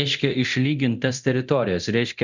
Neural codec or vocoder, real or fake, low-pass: codec, 24 kHz, 0.9 kbps, DualCodec; fake; 7.2 kHz